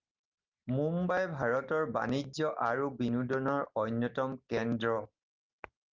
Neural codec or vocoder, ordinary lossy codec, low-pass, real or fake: none; Opus, 32 kbps; 7.2 kHz; real